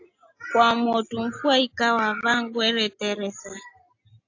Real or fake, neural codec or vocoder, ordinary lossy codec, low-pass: real; none; AAC, 48 kbps; 7.2 kHz